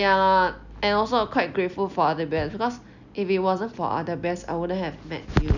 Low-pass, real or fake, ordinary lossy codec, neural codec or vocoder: 7.2 kHz; real; none; none